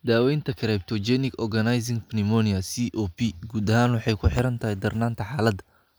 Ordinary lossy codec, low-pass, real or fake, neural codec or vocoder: none; none; real; none